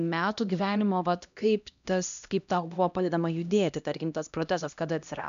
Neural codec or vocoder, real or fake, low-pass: codec, 16 kHz, 1 kbps, X-Codec, HuBERT features, trained on LibriSpeech; fake; 7.2 kHz